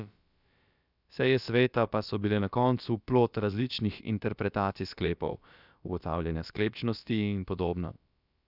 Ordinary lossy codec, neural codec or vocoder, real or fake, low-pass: none; codec, 16 kHz, about 1 kbps, DyCAST, with the encoder's durations; fake; 5.4 kHz